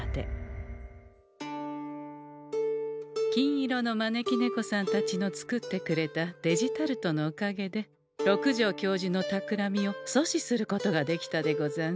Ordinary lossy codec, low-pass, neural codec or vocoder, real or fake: none; none; none; real